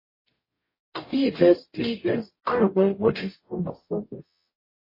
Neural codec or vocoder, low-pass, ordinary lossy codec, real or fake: codec, 44.1 kHz, 0.9 kbps, DAC; 5.4 kHz; MP3, 24 kbps; fake